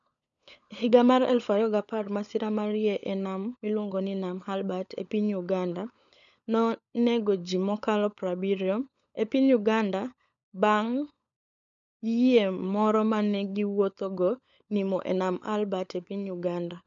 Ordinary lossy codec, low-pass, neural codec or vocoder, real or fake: none; 7.2 kHz; codec, 16 kHz, 16 kbps, FunCodec, trained on LibriTTS, 50 frames a second; fake